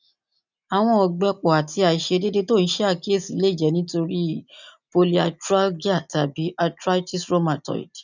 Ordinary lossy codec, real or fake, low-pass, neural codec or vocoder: none; real; 7.2 kHz; none